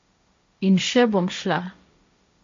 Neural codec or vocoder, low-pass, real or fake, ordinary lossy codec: codec, 16 kHz, 1.1 kbps, Voila-Tokenizer; 7.2 kHz; fake; MP3, 48 kbps